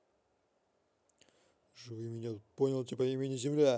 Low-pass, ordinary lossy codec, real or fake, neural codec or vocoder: none; none; real; none